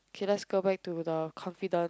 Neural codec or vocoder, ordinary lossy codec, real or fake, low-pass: none; none; real; none